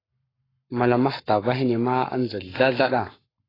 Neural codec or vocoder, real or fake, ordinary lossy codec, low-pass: codec, 44.1 kHz, 7.8 kbps, Pupu-Codec; fake; AAC, 24 kbps; 5.4 kHz